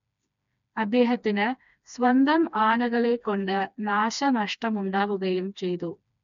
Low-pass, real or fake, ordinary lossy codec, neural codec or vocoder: 7.2 kHz; fake; none; codec, 16 kHz, 2 kbps, FreqCodec, smaller model